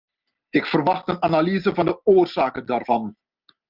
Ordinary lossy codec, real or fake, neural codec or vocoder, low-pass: Opus, 24 kbps; real; none; 5.4 kHz